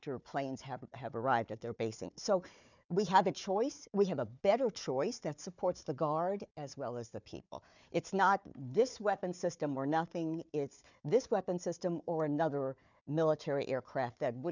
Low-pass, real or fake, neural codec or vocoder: 7.2 kHz; fake; codec, 16 kHz, 4 kbps, FunCodec, trained on Chinese and English, 50 frames a second